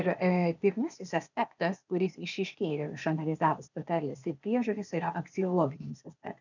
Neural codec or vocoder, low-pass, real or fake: codec, 16 kHz, 0.8 kbps, ZipCodec; 7.2 kHz; fake